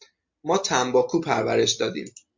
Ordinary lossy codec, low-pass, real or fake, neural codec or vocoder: MP3, 48 kbps; 7.2 kHz; real; none